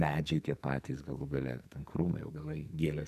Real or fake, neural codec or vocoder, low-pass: fake; codec, 44.1 kHz, 2.6 kbps, SNAC; 14.4 kHz